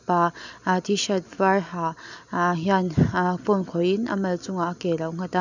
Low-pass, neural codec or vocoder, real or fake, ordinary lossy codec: 7.2 kHz; none; real; none